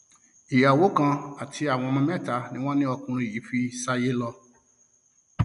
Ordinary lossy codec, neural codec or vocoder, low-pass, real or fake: none; none; 10.8 kHz; real